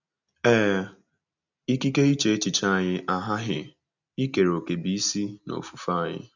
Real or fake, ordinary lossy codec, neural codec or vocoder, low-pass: real; none; none; 7.2 kHz